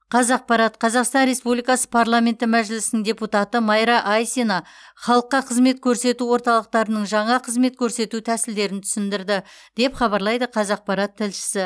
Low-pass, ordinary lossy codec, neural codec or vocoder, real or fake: none; none; none; real